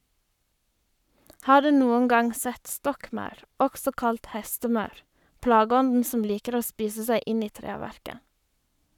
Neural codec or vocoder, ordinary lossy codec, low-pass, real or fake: codec, 44.1 kHz, 7.8 kbps, Pupu-Codec; none; 19.8 kHz; fake